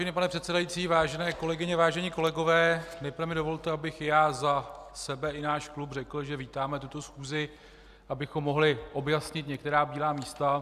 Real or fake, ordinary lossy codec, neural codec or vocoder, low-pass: real; Opus, 64 kbps; none; 14.4 kHz